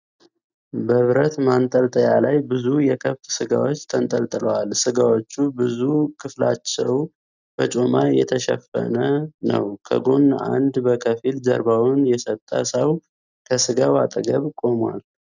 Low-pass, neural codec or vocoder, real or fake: 7.2 kHz; none; real